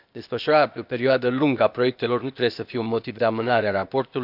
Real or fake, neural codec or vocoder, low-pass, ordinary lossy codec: fake; codec, 16 kHz, 0.8 kbps, ZipCodec; 5.4 kHz; none